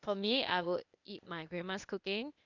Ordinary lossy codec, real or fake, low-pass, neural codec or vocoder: none; fake; 7.2 kHz; codec, 16 kHz, 0.8 kbps, ZipCodec